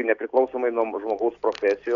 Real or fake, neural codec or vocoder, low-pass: real; none; 7.2 kHz